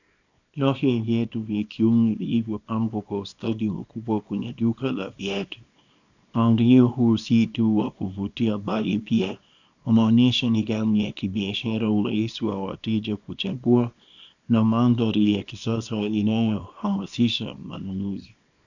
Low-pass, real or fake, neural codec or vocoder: 7.2 kHz; fake; codec, 24 kHz, 0.9 kbps, WavTokenizer, small release